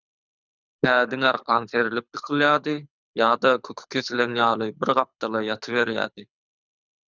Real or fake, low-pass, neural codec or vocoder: fake; 7.2 kHz; codec, 24 kHz, 6 kbps, HILCodec